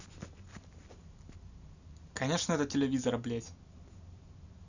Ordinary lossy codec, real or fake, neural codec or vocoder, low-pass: none; real; none; 7.2 kHz